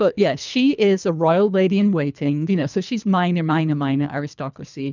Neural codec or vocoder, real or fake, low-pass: codec, 24 kHz, 3 kbps, HILCodec; fake; 7.2 kHz